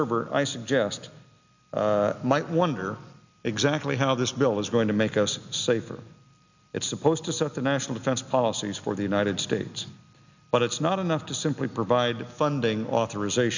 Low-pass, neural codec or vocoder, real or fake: 7.2 kHz; none; real